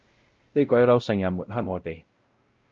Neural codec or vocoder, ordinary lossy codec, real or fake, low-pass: codec, 16 kHz, 0.5 kbps, X-Codec, WavLM features, trained on Multilingual LibriSpeech; Opus, 24 kbps; fake; 7.2 kHz